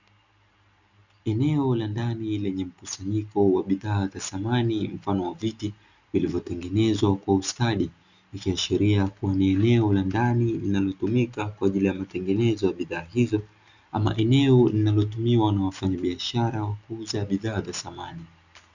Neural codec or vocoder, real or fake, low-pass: none; real; 7.2 kHz